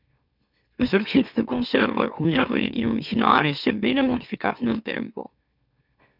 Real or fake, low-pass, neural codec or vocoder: fake; 5.4 kHz; autoencoder, 44.1 kHz, a latent of 192 numbers a frame, MeloTTS